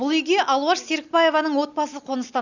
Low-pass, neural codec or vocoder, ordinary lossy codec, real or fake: 7.2 kHz; none; none; real